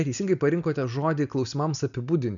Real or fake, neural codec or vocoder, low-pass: real; none; 7.2 kHz